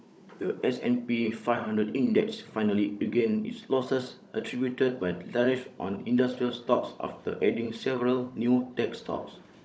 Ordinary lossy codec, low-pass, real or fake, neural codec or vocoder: none; none; fake; codec, 16 kHz, 16 kbps, FunCodec, trained on Chinese and English, 50 frames a second